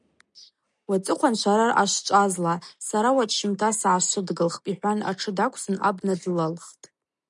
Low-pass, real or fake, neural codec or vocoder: 10.8 kHz; real; none